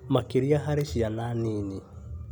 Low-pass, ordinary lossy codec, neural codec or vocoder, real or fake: 19.8 kHz; none; none; real